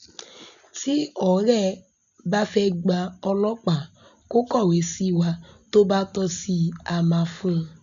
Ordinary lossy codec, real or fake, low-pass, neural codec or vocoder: none; real; 7.2 kHz; none